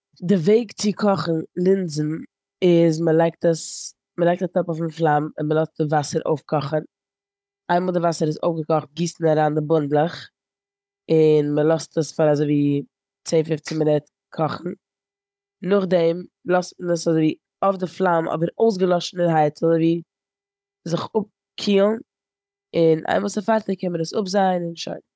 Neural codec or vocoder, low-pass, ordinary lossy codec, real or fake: codec, 16 kHz, 16 kbps, FunCodec, trained on Chinese and English, 50 frames a second; none; none; fake